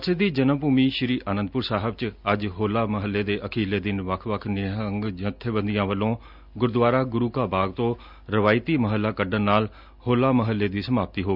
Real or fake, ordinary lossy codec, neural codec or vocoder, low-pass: real; none; none; 5.4 kHz